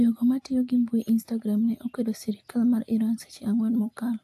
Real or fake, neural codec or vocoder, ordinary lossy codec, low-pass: real; none; AAC, 64 kbps; 14.4 kHz